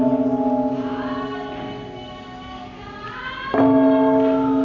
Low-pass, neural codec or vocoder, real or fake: 7.2 kHz; none; real